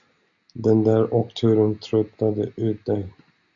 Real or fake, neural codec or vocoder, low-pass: real; none; 7.2 kHz